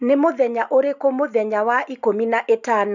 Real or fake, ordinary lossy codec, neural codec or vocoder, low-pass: real; none; none; 7.2 kHz